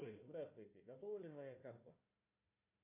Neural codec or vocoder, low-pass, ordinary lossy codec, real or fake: codec, 16 kHz, 1 kbps, FunCodec, trained on Chinese and English, 50 frames a second; 3.6 kHz; MP3, 24 kbps; fake